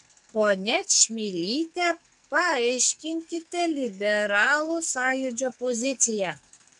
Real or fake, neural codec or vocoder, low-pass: fake; codec, 44.1 kHz, 2.6 kbps, SNAC; 10.8 kHz